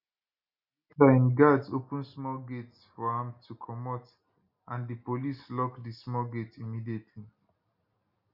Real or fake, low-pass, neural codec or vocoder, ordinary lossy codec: real; 5.4 kHz; none; none